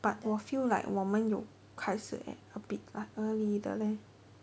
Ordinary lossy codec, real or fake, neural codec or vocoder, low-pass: none; real; none; none